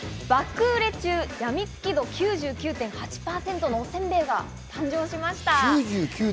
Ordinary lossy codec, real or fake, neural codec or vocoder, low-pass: none; real; none; none